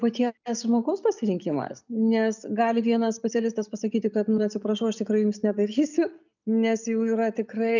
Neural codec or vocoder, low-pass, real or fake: none; 7.2 kHz; real